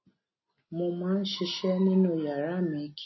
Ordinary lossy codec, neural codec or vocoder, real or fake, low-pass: MP3, 24 kbps; none; real; 7.2 kHz